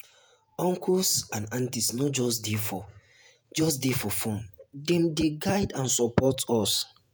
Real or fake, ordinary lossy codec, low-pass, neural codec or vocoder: fake; none; none; vocoder, 48 kHz, 128 mel bands, Vocos